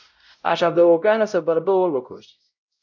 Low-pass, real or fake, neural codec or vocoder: 7.2 kHz; fake; codec, 16 kHz, 0.5 kbps, X-Codec, WavLM features, trained on Multilingual LibriSpeech